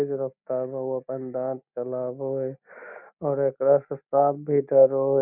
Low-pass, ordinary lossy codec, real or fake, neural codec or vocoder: 3.6 kHz; none; real; none